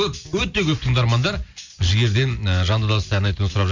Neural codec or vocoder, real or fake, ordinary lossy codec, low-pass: none; real; MP3, 64 kbps; 7.2 kHz